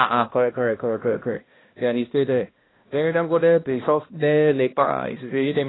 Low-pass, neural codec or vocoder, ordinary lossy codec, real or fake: 7.2 kHz; codec, 16 kHz, 1 kbps, X-Codec, HuBERT features, trained on balanced general audio; AAC, 16 kbps; fake